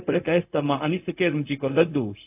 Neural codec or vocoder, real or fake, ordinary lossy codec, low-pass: codec, 16 kHz, 0.4 kbps, LongCat-Audio-Codec; fake; AAC, 32 kbps; 3.6 kHz